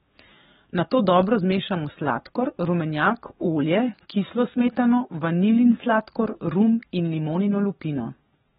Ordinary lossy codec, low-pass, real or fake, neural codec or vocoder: AAC, 16 kbps; 19.8 kHz; fake; codec, 44.1 kHz, 7.8 kbps, DAC